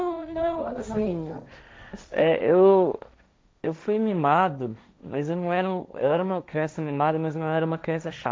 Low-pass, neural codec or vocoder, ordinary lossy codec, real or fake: none; codec, 16 kHz, 1.1 kbps, Voila-Tokenizer; none; fake